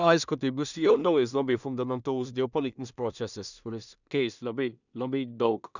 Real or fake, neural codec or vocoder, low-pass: fake; codec, 16 kHz in and 24 kHz out, 0.4 kbps, LongCat-Audio-Codec, two codebook decoder; 7.2 kHz